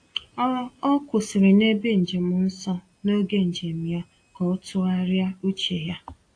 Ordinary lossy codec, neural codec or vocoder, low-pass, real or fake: AAC, 48 kbps; none; 9.9 kHz; real